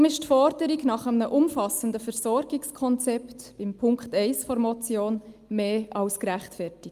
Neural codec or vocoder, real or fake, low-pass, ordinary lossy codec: none; real; 14.4 kHz; Opus, 32 kbps